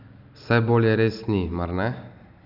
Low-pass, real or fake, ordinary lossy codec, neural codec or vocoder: 5.4 kHz; real; none; none